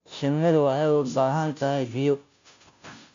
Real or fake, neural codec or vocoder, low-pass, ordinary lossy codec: fake; codec, 16 kHz, 0.5 kbps, FunCodec, trained on Chinese and English, 25 frames a second; 7.2 kHz; MP3, 64 kbps